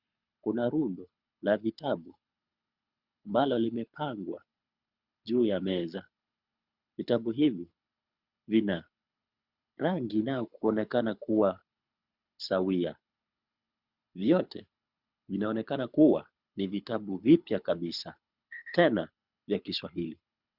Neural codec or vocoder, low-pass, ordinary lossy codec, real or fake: codec, 24 kHz, 6 kbps, HILCodec; 5.4 kHz; Opus, 64 kbps; fake